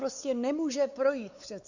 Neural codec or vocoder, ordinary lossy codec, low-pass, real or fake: codec, 16 kHz, 4 kbps, X-Codec, WavLM features, trained on Multilingual LibriSpeech; Opus, 64 kbps; 7.2 kHz; fake